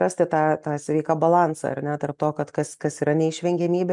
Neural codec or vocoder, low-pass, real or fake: none; 10.8 kHz; real